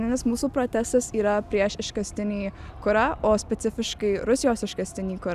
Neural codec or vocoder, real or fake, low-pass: none; real; 14.4 kHz